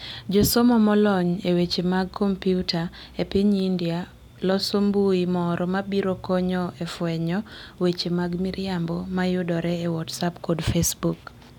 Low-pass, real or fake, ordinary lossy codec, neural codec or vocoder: 19.8 kHz; real; none; none